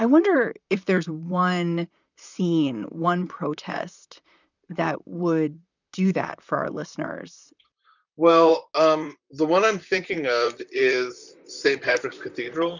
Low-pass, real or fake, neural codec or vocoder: 7.2 kHz; fake; vocoder, 44.1 kHz, 128 mel bands, Pupu-Vocoder